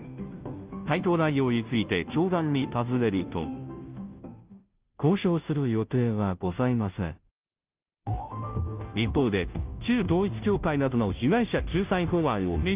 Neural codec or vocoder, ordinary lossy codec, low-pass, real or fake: codec, 16 kHz, 0.5 kbps, FunCodec, trained on Chinese and English, 25 frames a second; Opus, 24 kbps; 3.6 kHz; fake